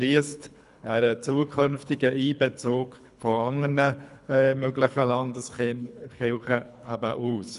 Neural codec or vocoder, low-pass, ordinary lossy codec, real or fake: codec, 24 kHz, 3 kbps, HILCodec; 10.8 kHz; none; fake